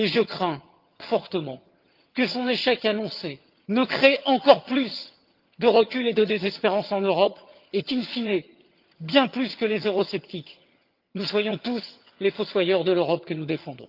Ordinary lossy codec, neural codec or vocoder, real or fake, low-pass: Opus, 32 kbps; vocoder, 22.05 kHz, 80 mel bands, HiFi-GAN; fake; 5.4 kHz